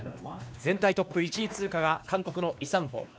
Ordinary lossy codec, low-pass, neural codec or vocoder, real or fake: none; none; codec, 16 kHz, 2 kbps, X-Codec, WavLM features, trained on Multilingual LibriSpeech; fake